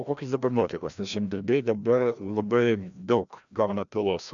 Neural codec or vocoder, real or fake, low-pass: codec, 16 kHz, 1 kbps, FreqCodec, larger model; fake; 7.2 kHz